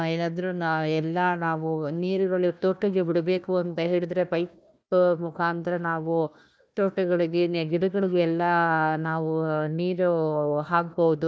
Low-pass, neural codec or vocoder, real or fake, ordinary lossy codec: none; codec, 16 kHz, 1 kbps, FunCodec, trained on Chinese and English, 50 frames a second; fake; none